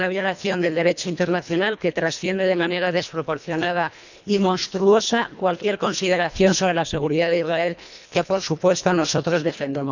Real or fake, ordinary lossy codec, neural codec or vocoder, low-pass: fake; none; codec, 24 kHz, 1.5 kbps, HILCodec; 7.2 kHz